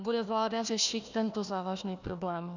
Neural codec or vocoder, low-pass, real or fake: codec, 16 kHz, 1 kbps, FunCodec, trained on Chinese and English, 50 frames a second; 7.2 kHz; fake